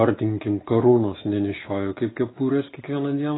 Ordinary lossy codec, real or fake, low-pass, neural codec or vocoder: AAC, 16 kbps; real; 7.2 kHz; none